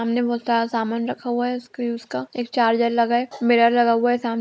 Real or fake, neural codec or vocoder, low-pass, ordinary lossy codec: real; none; none; none